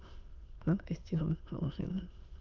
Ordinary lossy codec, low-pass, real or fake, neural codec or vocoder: Opus, 24 kbps; 7.2 kHz; fake; autoencoder, 22.05 kHz, a latent of 192 numbers a frame, VITS, trained on many speakers